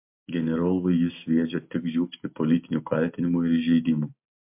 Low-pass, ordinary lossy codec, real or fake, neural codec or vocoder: 3.6 kHz; MP3, 32 kbps; real; none